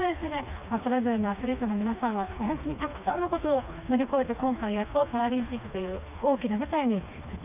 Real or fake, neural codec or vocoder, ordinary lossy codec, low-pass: fake; codec, 16 kHz, 2 kbps, FreqCodec, smaller model; none; 3.6 kHz